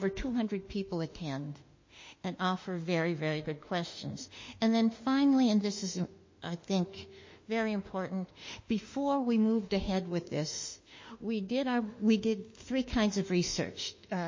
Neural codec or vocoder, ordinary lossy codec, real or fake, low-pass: autoencoder, 48 kHz, 32 numbers a frame, DAC-VAE, trained on Japanese speech; MP3, 32 kbps; fake; 7.2 kHz